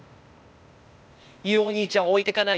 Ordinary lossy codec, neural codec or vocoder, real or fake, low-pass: none; codec, 16 kHz, 0.8 kbps, ZipCodec; fake; none